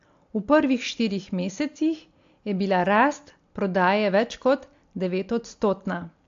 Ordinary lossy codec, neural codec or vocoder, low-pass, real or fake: AAC, 48 kbps; none; 7.2 kHz; real